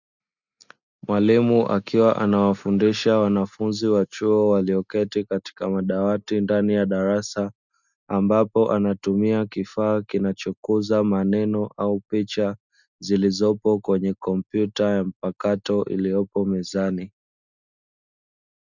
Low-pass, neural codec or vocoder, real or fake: 7.2 kHz; none; real